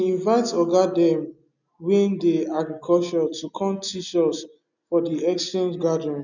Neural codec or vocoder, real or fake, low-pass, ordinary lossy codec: none; real; 7.2 kHz; none